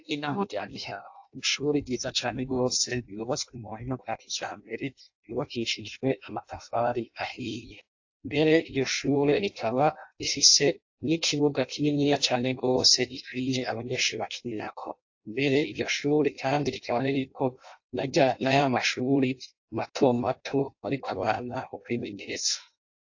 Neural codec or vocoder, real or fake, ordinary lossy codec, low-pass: codec, 16 kHz in and 24 kHz out, 0.6 kbps, FireRedTTS-2 codec; fake; AAC, 48 kbps; 7.2 kHz